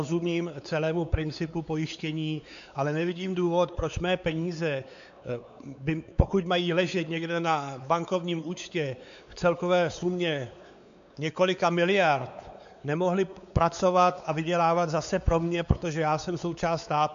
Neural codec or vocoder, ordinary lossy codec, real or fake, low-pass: codec, 16 kHz, 4 kbps, X-Codec, WavLM features, trained on Multilingual LibriSpeech; AAC, 96 kbps; fake; 7.2 kHz